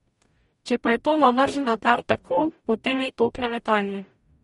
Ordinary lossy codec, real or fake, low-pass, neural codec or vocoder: MP3, 48 kbps; fake; 19.8 kHz; codec, 44.1 kHz, 0.9 kbps, DAC